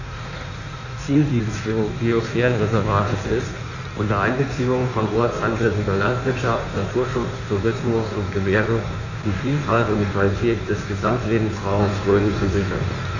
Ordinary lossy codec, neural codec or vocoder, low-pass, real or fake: none; codec, 16 kHz in and 24 kHz out, 1.1 kbps, FireRedTTS-2 codec; 7.2 kHz; fake